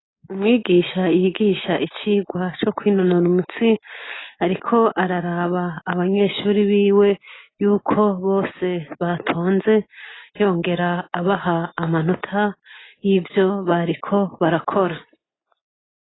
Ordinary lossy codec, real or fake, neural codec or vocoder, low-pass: AAC, 16 kbps; real; none; 7.2 kHz